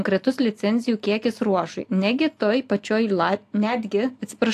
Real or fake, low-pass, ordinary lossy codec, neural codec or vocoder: real; 14.4 kHz; AAC, 64 kbps; none